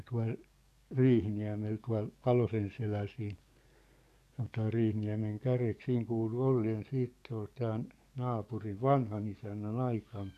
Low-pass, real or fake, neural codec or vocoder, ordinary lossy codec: 14.4 kHz; fake; codec, 44.1 kHz, 7.8 kbps, DAC; none